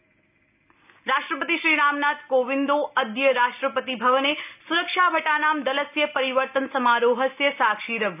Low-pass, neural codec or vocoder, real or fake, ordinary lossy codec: 3.6 kHz; none; real; none